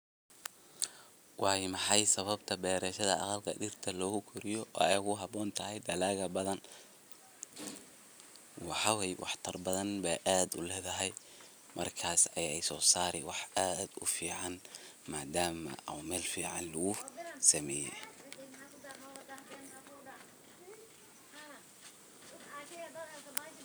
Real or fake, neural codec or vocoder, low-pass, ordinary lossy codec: real; none; none; none